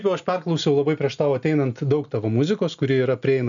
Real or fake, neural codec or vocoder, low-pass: real; none; 7.2 kHz